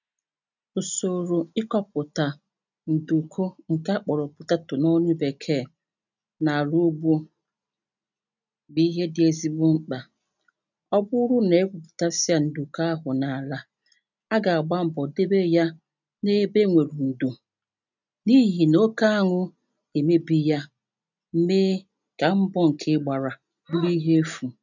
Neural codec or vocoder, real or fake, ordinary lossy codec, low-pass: none; real; none; 7.2 kHz